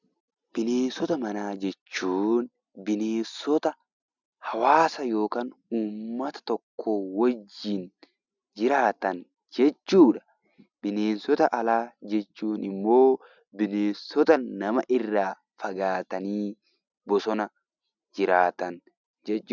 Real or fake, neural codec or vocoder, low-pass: real; none; 7.2 kHz